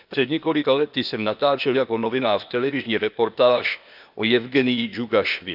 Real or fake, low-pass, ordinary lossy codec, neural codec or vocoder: fake; 5.4 kHz; none; codec, 16 kHz, 0.8 kbps, ZipCodec